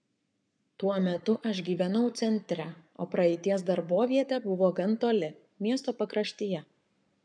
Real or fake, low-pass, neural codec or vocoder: fake; 9.9 kHz; codec, 44.1 kHz, 7.8 kbps, Pupu-Codec